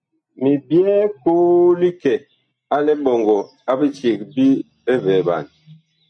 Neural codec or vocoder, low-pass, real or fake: none; 9.9 kHz; real